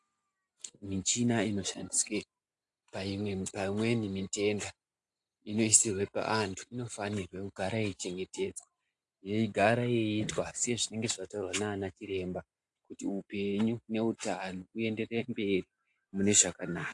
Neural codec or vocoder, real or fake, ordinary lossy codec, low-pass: none; real; AAC, 64 kbps; 10.8 kHz